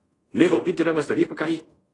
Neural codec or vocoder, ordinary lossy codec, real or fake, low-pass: codec, 24 kHz, 0.5 kbps, DualCodec; AAC, 32 kbps; fake; 10.8 kHz